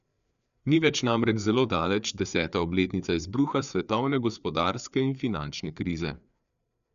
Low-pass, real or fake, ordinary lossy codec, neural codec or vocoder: 7.2 kHz; fake; none; codec, 16 kHz, 4 kbps, FreqCodec, larger model